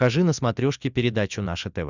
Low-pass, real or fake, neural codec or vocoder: 7.2 kHz; real; none